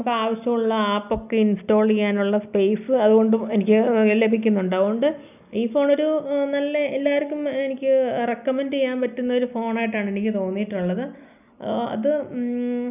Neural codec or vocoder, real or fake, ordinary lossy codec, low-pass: none; real; none; 3.6 kHz